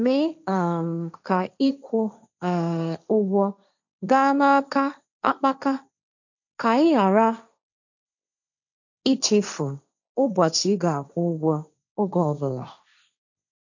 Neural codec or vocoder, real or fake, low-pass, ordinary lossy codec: codec, 16 kHz, 1.1 kbps, Voila-Tokenizer; fake; 7.2 kHz; none